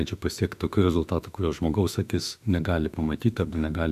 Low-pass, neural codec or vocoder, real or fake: 14.4 kHz; autoencoder, 48 kHz, 32 numbers a frame, DAC-VAE, trained on Japanese speech; fake